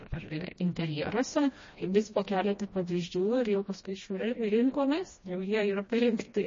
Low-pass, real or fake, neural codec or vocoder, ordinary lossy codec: 7.2 kHz; fake; codec, 16 kHz, 1 kbps, FreqCodec, smaller model; MP3, 32 kbps